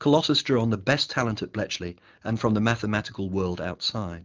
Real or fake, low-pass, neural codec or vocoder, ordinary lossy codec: real; 7.2 kHz; none; Opus, 32 kbps